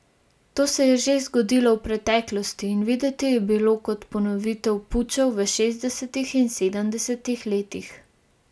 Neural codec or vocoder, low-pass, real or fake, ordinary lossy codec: none; none; real; none